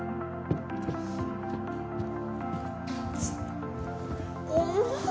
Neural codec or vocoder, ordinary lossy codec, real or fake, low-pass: none; none; real; none